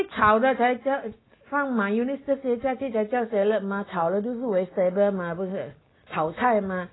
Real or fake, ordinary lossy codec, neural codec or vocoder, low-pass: real; AAC, 16 kbps; none; 7.2 kHz